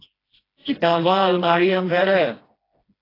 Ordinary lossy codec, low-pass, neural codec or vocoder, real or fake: AAC, 24 kbps; 5.4 kHz; codec, 16 kHz, 1 kbps, FreqCodec, smaller model; fake